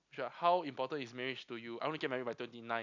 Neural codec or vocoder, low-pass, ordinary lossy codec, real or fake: none; 7.2 kHz; none; real